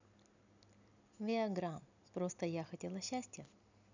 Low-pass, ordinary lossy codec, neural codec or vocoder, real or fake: 7.2 kHz; none; none; real